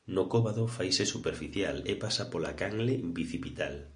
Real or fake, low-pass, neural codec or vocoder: real; 9.9 kHz; none